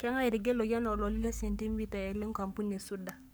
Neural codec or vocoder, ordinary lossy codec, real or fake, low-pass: codec, 44.1 kHz, 7.8 kbps, Pupu-Codec; none; fake; none